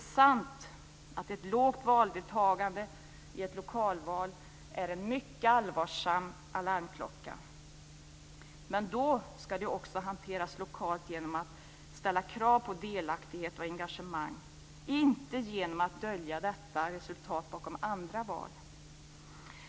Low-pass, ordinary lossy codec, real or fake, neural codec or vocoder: none; none; real; none